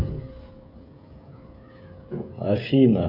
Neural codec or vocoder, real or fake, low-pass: codec, 16 kHz, 16 kbps, FreqCodec, smaller model; fake; 5.4 kHz